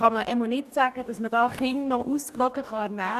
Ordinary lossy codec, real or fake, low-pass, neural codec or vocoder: none; fake; 14.4 kHz; codec, 44.1 kHz, 2.6 kbps, DAC